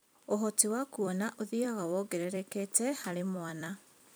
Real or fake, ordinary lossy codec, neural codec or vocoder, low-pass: fake; none; vocoder, 44.1 kHz, 128 mel bands every 256 samples, BigVGAN v2; none